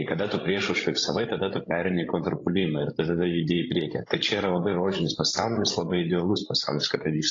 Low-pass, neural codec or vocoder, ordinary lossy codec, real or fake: 7.2 kHz; codec, 16 kHz, 16 kbps, FreqCodec, larger model; AAC, 32 kbps; fake